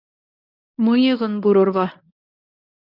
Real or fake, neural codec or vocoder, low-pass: fake; codec, 24 kHz, 0.9 kbps, WavTokenizer, medium speech release version 1; 5.4 kHz